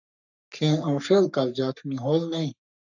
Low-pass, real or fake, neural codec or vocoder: 7.2 kHz; fake; codec, 44.1 kHz, 3.4 kbps, Pupu-Codec